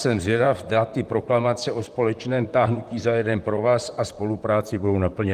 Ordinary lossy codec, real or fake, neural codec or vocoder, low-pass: Opus, 32 kbps; fake; vocoder, 44.1 kHz, 128 mel bands, Pupu-Vocoder; 14.4 kHz